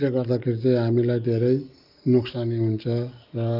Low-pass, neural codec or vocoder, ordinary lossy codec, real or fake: 5.4 kHz; none; Opus, 24 kbps; real